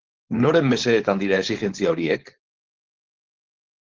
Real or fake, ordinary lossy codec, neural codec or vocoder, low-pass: fake; Opus, 16 kbps; codec, 16 kHz, 4.8 kbps, FACodec; 7.2 kHz